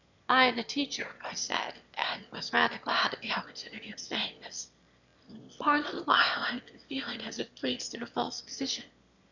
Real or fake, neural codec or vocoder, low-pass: fake; autoencoder, 22.05 kHz, a latent of 192 numbers a frame, VITS, trained on one speaker; 7.2 kHz